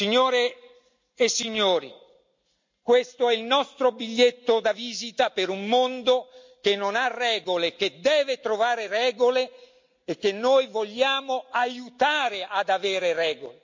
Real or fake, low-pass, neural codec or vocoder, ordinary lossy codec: real; 7.2 kHz; none; MP3, 64 kbps